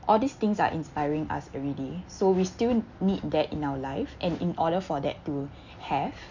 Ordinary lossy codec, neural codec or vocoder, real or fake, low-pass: none; none; real; 7.2 kHz